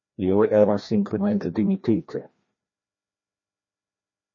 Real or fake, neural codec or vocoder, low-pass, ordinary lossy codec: fake; codec, 16 kHz, 1 kbps, FreqCodec, larger model; 7.2 kHz; MP3, 32 kbps